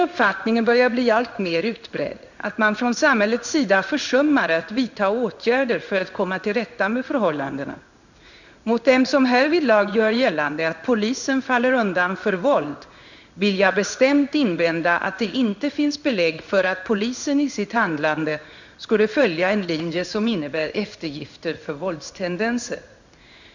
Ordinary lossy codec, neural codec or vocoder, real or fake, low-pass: none; codec, 16 kHz in and 24 kHz out, 1 kbps, XY-Tokenizer; fake; 7.2 kHz